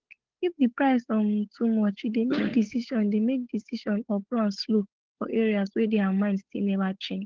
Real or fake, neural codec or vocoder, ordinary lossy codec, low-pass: fake; codec, 16 kHz, 8 kbps, FunCodec, trained on Chinese and English, 25 frames a second; Opus, 16 kbps; 7.2 kHz